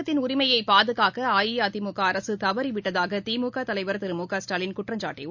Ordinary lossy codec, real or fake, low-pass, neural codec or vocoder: none; real; 7.2 kHz; none